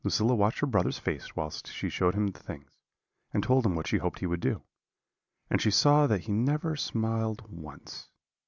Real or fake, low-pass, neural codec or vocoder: real; 7.2 kHz; none